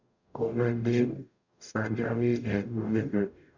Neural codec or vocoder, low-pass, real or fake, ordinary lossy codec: codec, 44.1 kHz, 0.9 kbps, DAC; 7.2 kHz; fake; none